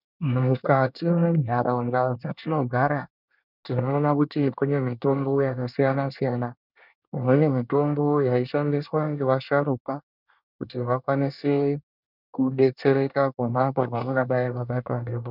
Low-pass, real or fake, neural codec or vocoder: 5.4 kHz; fake; codec, 24 kHz, 1 kbps, SNAC